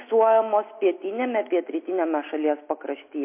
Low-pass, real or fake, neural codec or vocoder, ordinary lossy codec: 3.6 kHz; real; none; MP3, 24 kbps